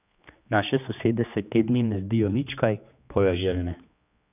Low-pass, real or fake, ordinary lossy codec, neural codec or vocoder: 3.6 kHz; fake; none; codec, 16 kHz, 2 kbps, X-Codec, HuBERT features, trained on general audio